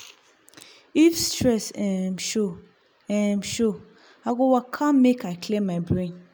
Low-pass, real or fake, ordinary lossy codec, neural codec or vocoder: none; real; none; none